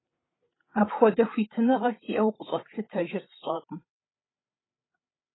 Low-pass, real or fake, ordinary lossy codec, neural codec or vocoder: 7.2 kHz; fake; AAC, 16 kbps; codec, 16 kHz, 8 kbps, FreqCodec, larger model